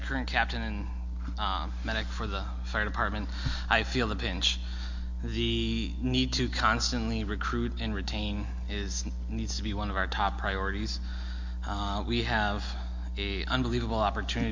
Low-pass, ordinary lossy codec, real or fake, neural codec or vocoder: 7.2 kHz; MP3, 48 kbps; real; none